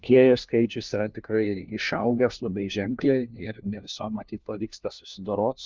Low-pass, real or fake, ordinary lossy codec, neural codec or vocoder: 7.2 kHz; fake; Opus, 24 kbps; codec, 16 kHz, 1 kbps, FunCodec, trained on LibriTTS, 50 frames a second